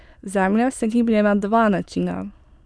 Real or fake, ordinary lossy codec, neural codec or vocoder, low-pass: fake; none; autoencoder, 22.05 kHz, a latent of 192 numbers a frame, VITS, trained on many speakers; none